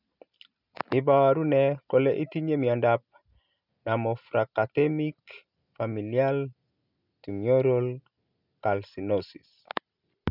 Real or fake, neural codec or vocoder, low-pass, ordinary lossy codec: real; none; 5.4 kHz; none